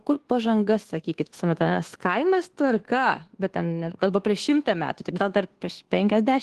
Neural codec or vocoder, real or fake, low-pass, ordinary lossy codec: codec, 24 kHz, 1.2 kbps, DualCodec; fake; 10.8 kHz; Opus, 16 kbps